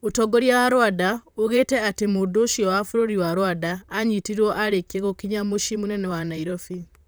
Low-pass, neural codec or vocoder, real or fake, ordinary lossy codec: none; vocoder, 44.1 kHz, 128 mel bands, Pupu-Vocoder; fake; none